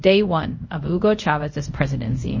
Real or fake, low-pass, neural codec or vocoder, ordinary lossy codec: fake; 7.2 kHz; codec, 24 kHz, 0.5 kbps, DualCodec; MP3, 32 kbps